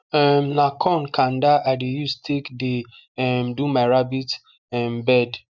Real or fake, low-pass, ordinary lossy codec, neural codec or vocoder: real; 7.2 kHz; none; none